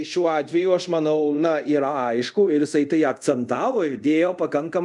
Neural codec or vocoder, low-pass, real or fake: codec, 24 kHz, 0.5 kbps, DualCodec; 10.8 kHz; fake